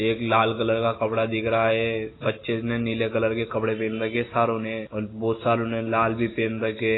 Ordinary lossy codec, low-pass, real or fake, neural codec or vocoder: AAC, 16 kbps; 7.2 kHz; real; none